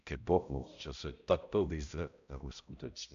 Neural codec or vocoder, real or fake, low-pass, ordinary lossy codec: codec, 16 kHz, 0.5 kbps, X-Codec, HuBERT features, trained on balanced general audio; fake; 7.2 kHz; AAC, 96 kbps